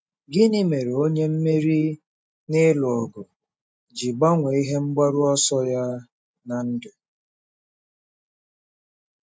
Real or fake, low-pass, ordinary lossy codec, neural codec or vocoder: real; none; none; none